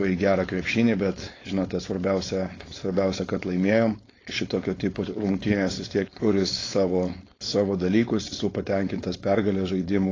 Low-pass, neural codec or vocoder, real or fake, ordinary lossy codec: 7.2 kHz; codec, 16 kHz, 4.8 kbps, FACodec; fake; AAC, 32 kbps